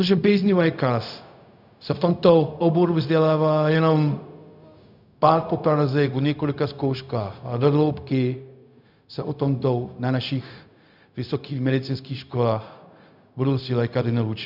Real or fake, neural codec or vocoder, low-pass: fake; codec, 16 kHz, 0.4 kbps, LongCat-Audio-Codec; 5.4 kHz